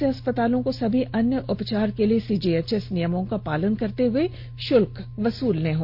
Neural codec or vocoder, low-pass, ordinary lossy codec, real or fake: none; 5.4 kHz; none; real